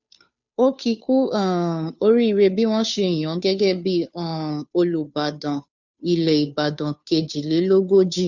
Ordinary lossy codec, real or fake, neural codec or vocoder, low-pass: none; fake; codec, 16 kHz, 2 kbps, FunCodec, trained on Chinese and English, 25 frames a second; 7.2 kHz